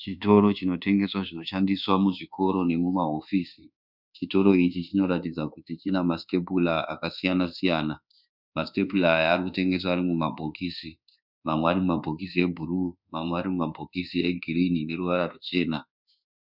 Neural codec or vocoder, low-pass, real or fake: codec, 24 kHz, 1.2 kbps, DualCodec; 5.4 kHz; fake